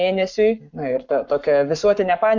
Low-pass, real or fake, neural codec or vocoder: 7.2 kHz; fake; codec, 44.1 kHz, 7.8 kbps, Pupu-Codec